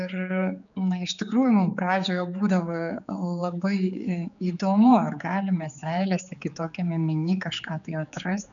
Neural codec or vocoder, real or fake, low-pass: codec, 16 kHz, 4 kbps, X-Codec, HuBERT features, trained on general audio; fake; 7.2 kHz